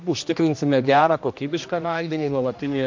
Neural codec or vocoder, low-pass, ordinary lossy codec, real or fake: codec, 16 kHz, 1 kbps, X-Codec, HuBERT features, trained on general audio; 7.2 kHz; MP3, 48 kbps; fake